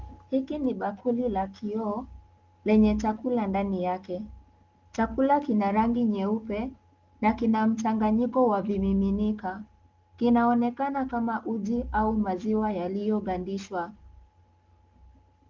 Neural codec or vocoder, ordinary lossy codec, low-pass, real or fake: none; Opus, 32 kbps; 7.2 kHz; real